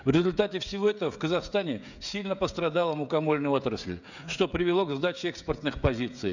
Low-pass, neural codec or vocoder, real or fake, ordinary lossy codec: 7.2 kHz; codec, 16 kHz, 16 kbps, FreqCodec, smaller model; fake; none